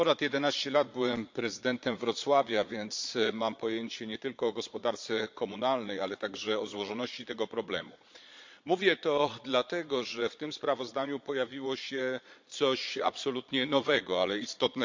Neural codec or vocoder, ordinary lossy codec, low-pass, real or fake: vocoder, 22.05 kHz, 80 mel bands, Vocos; MP3, 64 kbps; 7.2 kHz; fake